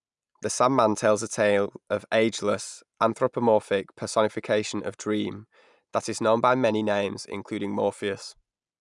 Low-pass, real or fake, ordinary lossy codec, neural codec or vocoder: 10.8 kHz; real; none; none